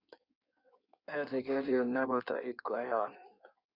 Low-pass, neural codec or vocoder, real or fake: 5.4 kHz; codec, 16 kHz in and 24 kHz out, 1.1 kbps, FireRedTTS-2 codec; fake